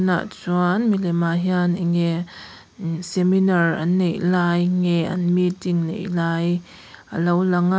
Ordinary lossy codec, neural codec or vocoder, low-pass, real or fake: none; none; none; real